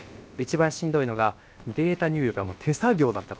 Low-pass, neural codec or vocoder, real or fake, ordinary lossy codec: none; codec, 16 kHz, about 1 kbps, DyCAST, with the encoder's durations; fake; none